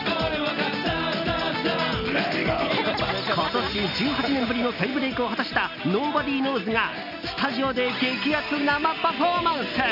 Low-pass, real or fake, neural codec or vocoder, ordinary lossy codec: 5.4 kHz; real; none; none